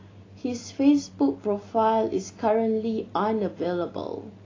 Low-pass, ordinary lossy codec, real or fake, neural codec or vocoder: 7.2 kHz; AAC, 32 kbps; real; none